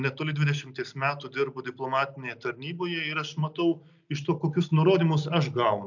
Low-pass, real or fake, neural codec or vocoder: 7.2 kHz; real; none